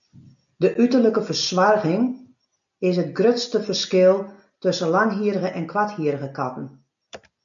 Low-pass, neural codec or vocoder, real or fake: 7.2 kHz; none; real